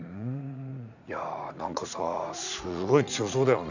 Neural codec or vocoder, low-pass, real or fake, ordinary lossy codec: vocoder, 44.1 kHz, 80 mel bands, Vocos; 7.2 kHz; fake; none